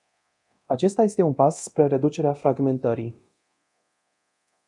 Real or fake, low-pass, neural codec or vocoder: fake; 10.8 kHz; codec, 24 kHz, 0.9 kbps, DualCodec